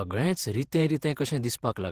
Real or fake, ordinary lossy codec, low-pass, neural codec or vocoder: fake; Opus, 16 kbps; 14.4 kHz; vocoder, 48 kHz, 128 mel bands, Vocos